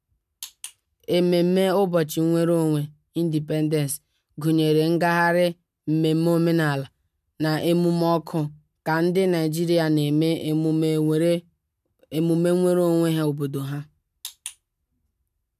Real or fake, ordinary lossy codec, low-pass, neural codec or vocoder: real; none; 14.4 kHz; none